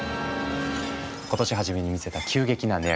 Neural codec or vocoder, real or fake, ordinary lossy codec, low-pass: none; real; none; none